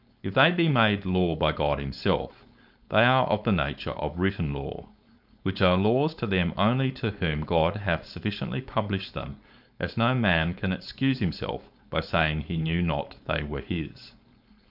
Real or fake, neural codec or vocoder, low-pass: fake; codec, 16 kHz, 4.8 kbps, FACodec; 5.4 kHz